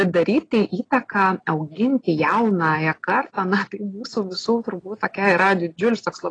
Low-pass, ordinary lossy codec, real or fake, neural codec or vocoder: 9.9 kHz; AAC, 32 kbps; real; none